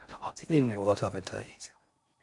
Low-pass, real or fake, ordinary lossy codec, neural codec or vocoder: 10.8 kHz; fake; MP3, 64 kbps; codec, 16 kHz in and 24 kHz out, 0.6 kbps, FocalCodec, streaming, 4096 codes